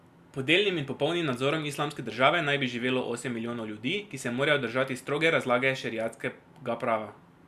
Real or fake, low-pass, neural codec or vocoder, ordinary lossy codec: real; 14.4 kHz; none; Opus, 64 kbps